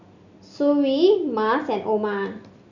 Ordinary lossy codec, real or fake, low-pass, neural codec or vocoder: none; real; 7.2 kHz; none